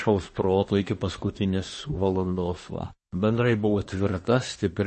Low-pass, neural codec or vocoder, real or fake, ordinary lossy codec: 10.8 kHz; codec, 24 kHz, 1 kbps, SNAC; fake; MP3, 32 kbps